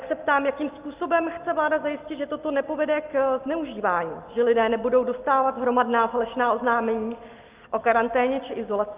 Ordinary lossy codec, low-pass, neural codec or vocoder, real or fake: Opus, 32 kbps; 3.6 kHz; none; real